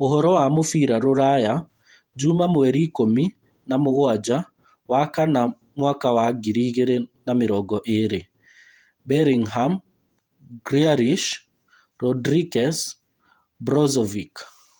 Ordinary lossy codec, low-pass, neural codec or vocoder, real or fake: Opus, 32 kbps; 19.8 kHz; vocoder, 48 kHz, 128 mel bands, Vocos; fake